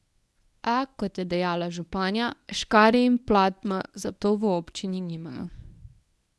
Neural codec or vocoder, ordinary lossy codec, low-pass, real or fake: codec, 24 kHz, 0.9 kbps, WavTokenizer, medium speech release version 1; none; none; fake